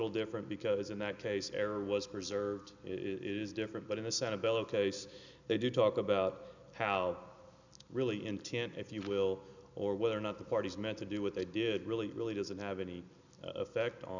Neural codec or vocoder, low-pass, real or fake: none; 7.2 kHz; real